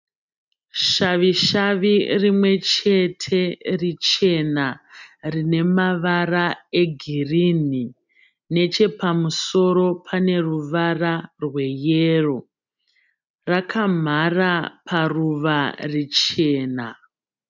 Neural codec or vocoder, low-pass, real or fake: none; 7.2 kHz; real